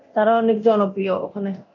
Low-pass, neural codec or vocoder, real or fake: 7.2 kHz; codec, 24 kHz, 0.9 kbps, DualCodec; fake